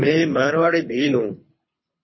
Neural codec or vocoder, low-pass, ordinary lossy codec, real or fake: codec, 24 kHz, 3 kbps, HILCodec; 7.2 kHz; MP3, 24 kbps; fake